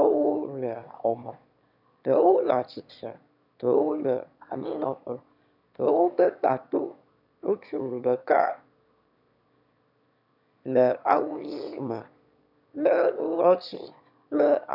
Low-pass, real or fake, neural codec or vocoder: 5.4 kHz; fake; autoencoder, 22.05 kHz, a latent of 192 numbers a frame, VITS, trained on one speaker